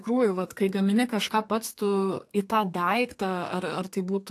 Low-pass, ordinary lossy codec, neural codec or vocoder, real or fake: 14.4 kHz; AAC, 64 kbps; codec, 32 kHz, 1.9 kbps, SNAC; fake